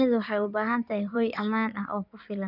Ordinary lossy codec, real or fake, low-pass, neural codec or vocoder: none; fake; 5.4 kHz; codec, 16 kHz in and 24 kHz out, 2.2 kbps, FireRedTTS-2 codec